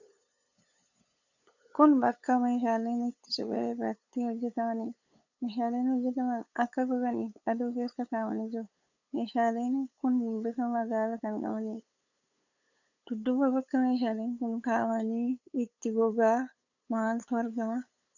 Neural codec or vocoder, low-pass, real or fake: codec, 16 kHz, 8 kbps, FunCodec, trained on LibriTTS, 25 frames a second; 7.2 kHz; fake